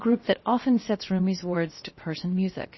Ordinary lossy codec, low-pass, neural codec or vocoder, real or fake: MP3, 24 kbps; 7.2 kHz; codec, 16 kHz, 0.7 kbps, FocalCodec; fake